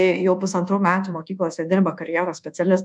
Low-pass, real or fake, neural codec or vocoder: 10.8 kHz; fake; codec, 24 kHz, 1.2 kbps, DualCodec